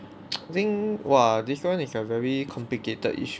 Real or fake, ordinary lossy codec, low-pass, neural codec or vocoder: real; none; none; none